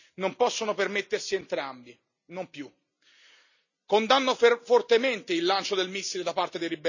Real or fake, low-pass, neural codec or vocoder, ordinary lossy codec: real; 7.2 kHz; none; none